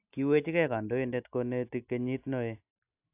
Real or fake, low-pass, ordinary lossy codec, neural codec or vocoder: real; 3.6 kHz; AAC, 32 kbps; none